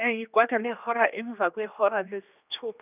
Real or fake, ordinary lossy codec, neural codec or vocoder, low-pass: fake; none; codec, 16 kHz, 2 kbps, X-Codec, HuBERT features, trained on general audio; 3.6 kHz